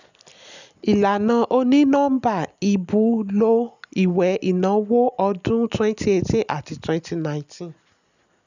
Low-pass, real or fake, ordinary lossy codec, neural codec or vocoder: 7.2 kHz; real; none; none